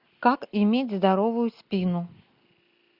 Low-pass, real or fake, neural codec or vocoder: 5.4 kHz; real; none